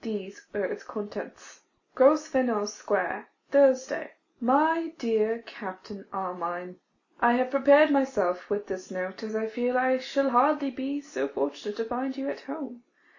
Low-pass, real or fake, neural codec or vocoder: 7.2 kHz; real; none